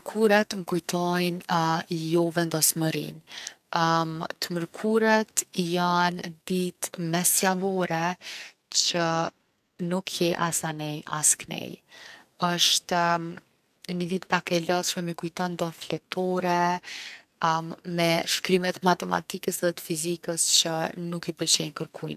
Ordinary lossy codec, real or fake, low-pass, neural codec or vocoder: none; fake; 14.4 kHz; codec, 44.1 kHz, 2.6 kbps, SNAC